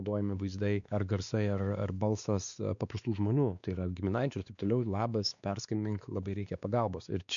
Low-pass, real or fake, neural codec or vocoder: 7.2 kHz; fake; codec, 16 kHz, 2 kbps, X-Codec, WavLM features, trained on Multilingual LibriSpeech